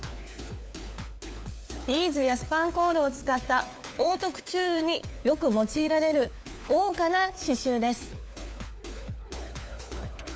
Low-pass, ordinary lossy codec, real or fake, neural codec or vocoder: none; none; fake; codec, 16 kHz, 4 kbps, FunCodec, trained on LibriTTS, 50 frames a second